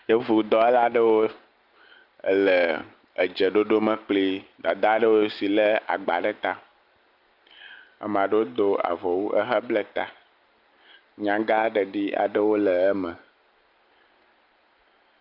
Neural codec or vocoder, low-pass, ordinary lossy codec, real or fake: none; 5.4 kHz; Opus, 24 kbps; real